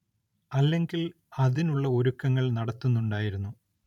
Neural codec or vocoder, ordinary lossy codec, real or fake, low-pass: vocoder, 44.1 kHz, 128 mel bands every 512 samples, BigVGAN v2; none; fake; 19.8 kHz